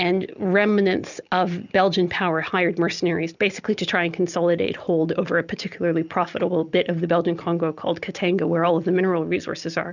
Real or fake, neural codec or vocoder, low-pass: real; none; 7.2 kHz